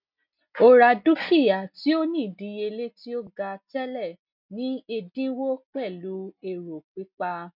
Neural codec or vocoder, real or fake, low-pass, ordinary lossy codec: none; real; 5.4 kHz; none